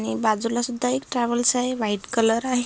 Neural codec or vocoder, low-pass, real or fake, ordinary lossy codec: none; none; real; none